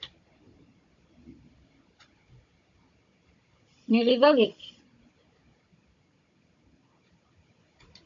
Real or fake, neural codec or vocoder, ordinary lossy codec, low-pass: fake; codec, 16 kHz, 16 kbps, FunCodec, trained on Chinese and English, 50 frames a second; MP3, 48 kbps; 7.2 kHz